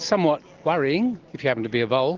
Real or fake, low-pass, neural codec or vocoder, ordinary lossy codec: real; 7.2 kHz; none; Opus, 16 kbps